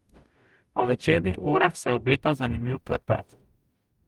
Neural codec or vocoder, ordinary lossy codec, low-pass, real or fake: codec, 44.1 kHz, 0.9 kbps, DAC; Opus, 32 kbps; 19.8 kHz; fake